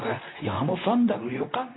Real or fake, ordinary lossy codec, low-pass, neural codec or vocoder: fake; AAC, 16 kbps; 7.2 kHz; codec, 24 kHz, 0.9 kbps, WavTokenizer, medium speech release version 2